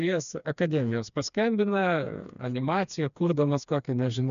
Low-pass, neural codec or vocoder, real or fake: 7.2 kHz; codec, 16 kHz, 2 kbps, FreqCodec, smaller model; fake